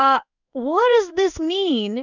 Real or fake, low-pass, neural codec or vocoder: fake; 7.2 kHz; codec, 16 kHz in and 24 kHz out, 1 kbps, XY-Tokenizer